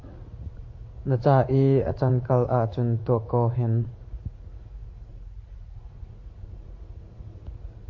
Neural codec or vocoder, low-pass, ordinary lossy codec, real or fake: vocoder, 44.1 kHz, 80 mel bands, Vocos; 7.2 kHz; MP3, 32 kbps; fake